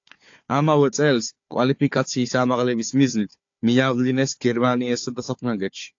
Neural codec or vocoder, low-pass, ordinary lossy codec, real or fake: codec, 16 kHz, 4 kbps, FunCodec, trained on Chinese and English, 50 frames a second; 7.2 kHz; AAC, 48 kbps; fake